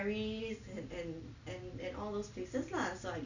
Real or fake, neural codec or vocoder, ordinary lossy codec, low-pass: real; none; none; 7.2 kHz